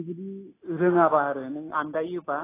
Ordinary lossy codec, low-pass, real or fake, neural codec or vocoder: AAC, 16 kbps; 3.6 kHz; real; none